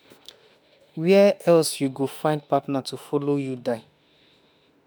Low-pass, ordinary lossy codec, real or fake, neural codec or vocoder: none; none; fake; autoencoder, 48 kHz, 32 numbers a frame, DAC-VAE, trained on Japanese speech